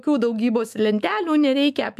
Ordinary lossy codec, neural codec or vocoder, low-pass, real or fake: AAC, 96 kbps; autoencoder, 48 kHz, 128 numbers a frame, DAC-VAE, trained on Japanese speech; 14.4 kHz; fake